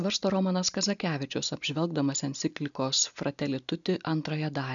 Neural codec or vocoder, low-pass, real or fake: none; 7.2 kHz; real